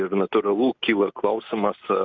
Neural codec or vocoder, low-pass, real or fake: none; 7.2 kHz; real